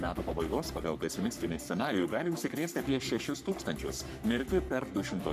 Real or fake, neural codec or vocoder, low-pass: fake; codec, 44.1 kHz, 3.4 kbps, Pupu-Codec; 14.4 kHz